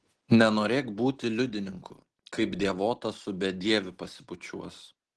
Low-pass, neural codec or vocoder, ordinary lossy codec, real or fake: 10.8 kHz; none; Opus, 16 kbps; real